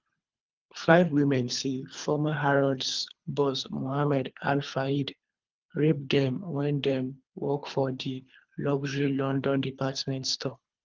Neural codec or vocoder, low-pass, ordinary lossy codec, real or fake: codec, 24 kHz, 3 kbps, HILCodec; 7.2 kHz; Opus, 24 kbps; fake